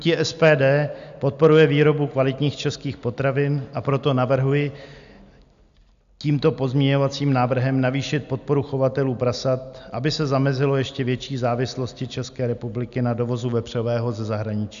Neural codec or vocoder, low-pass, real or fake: none; 7.2 kHz; real